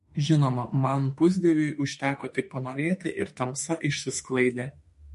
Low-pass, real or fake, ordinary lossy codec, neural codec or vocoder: 14.4 kHz; fake; MP3, 48 kbps; autoencoder, 48 kHz, 32 numbers a frame, DAC-VAE, trained on Japanese speech